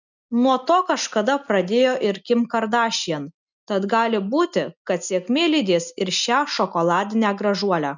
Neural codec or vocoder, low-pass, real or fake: none; 7.2 kHz; real